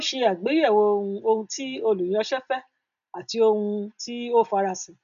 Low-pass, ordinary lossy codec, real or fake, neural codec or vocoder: 7.2 kHz; MP3, 64 kbps; real; none